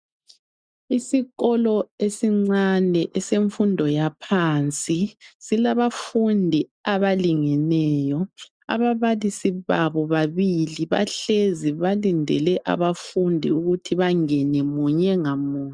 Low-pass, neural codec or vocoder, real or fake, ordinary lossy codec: 9.9 kHz; none; real; MP3, 96 kbps